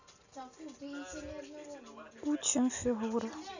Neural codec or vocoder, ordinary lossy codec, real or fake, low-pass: none; none; real; 7.2 kHz